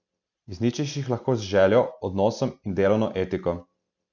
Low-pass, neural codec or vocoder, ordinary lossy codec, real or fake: 7.2 kHz; none; none; real